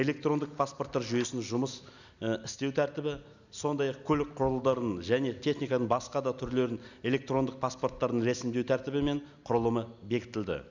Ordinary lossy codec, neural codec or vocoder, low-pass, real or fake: none; none; 7.2 kHz; real